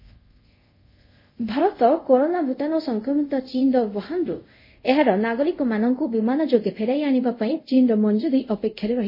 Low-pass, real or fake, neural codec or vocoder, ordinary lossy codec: 5.4 kHz; fake; codec, 24 kHz, 0.5 kbps, DualCodec; MP3, 24 kbps